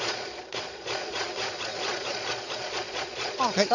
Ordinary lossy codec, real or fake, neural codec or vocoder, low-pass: none; fake; vocoder, 22.05 kHz, 80 mel bands, Vocos; 7.2 kHz